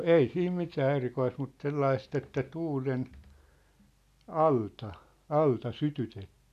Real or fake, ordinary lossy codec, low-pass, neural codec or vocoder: real; none; 14.4 kHz; none